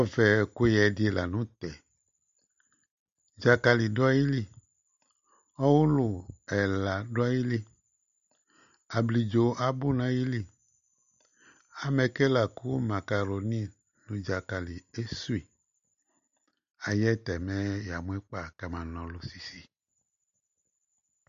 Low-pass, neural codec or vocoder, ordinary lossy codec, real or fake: 7.2 kHz; none; AAC, 96 kbps; real